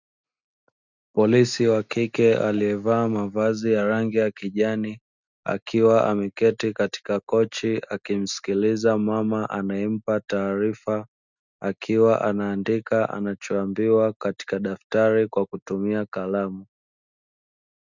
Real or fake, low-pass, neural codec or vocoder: real; 7.2 kHz; none